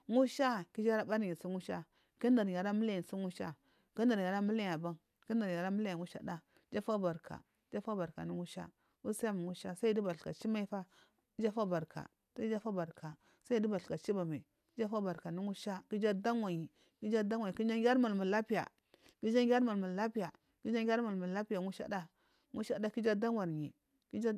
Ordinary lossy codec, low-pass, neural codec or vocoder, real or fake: none; 14.4 kHz; none; real